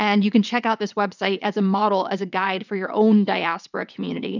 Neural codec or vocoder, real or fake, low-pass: vocoder, 22.05 kHz, 80 mel bands, WaveNeXt; fake; 7.2 kHz